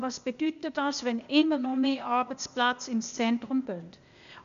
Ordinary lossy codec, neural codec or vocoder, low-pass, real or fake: AAC, 96 kbps; codec, 16 kHz, 0.8 kbps, ZipCodec; 7.2 kHz; fake